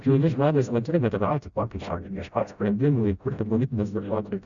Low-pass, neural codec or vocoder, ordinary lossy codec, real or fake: 7.2 kHz; codec, 16 kHz, 0.5 kbps, FreqCodec, smaller model; Opus, 64 kbps; fake